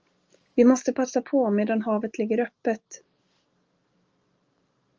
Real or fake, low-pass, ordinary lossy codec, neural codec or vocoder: real; 7.2 kHz; Opus, 24 kbps; none